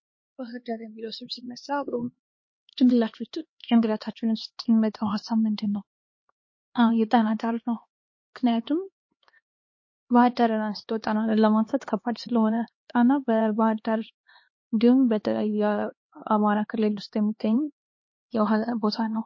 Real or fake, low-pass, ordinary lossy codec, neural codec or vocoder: fake; 7.2 kHz; MP3, 32 kbps; codec, 16 kHz, 2 kbps, X-Codec, HuBERT features, trained on LibriSpeech